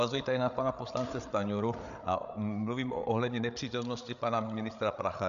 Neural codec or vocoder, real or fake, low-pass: codec, 16 kHz, 8 kbps, FreqCodec, larger model; fake; 7.2 kHz